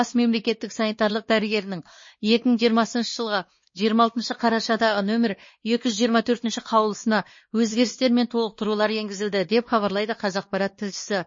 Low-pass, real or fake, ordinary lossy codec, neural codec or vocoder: 7.2 kHz; fake; MP3, 32 kbps; codec, 16 kHz, 2 kbps, X-Codec, WavLM features, trained on Multilingual LibriSpeech